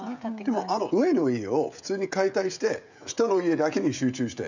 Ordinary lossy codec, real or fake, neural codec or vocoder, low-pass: none; fake; codec, 16 kHz, 16 kbps, FreqCodec, smaller model; 7.2 kHz